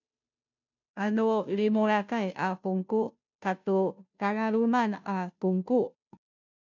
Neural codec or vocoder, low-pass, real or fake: codec, 16 kHz, 0.5 kbps, FunCodec, trained on Chinese and English, 25 frames a second; 7.2 kHz; fake